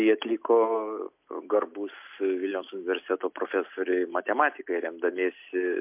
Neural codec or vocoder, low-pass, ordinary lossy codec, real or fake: none; 3.6 kHz; MP3, 32 kbps; real